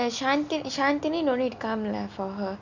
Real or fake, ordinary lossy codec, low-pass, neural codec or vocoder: real; none; 7.2 kHz; none